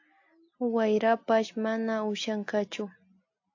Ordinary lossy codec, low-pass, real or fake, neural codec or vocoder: AAC, 48 kbps; 7.2 kHz; real; none